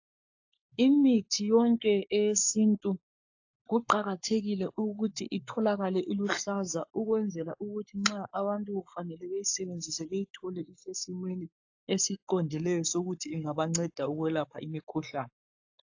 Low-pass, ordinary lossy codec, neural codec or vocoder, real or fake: 7.2 kHz; AAC, 48 kbps; codec, 44.1 kHz, 7.8 kbps, Pupu-Codec; fake